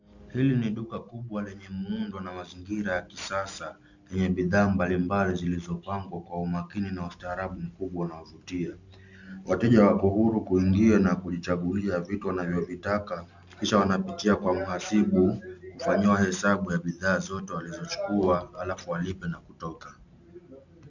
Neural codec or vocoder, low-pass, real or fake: none; 7.2 kHz; real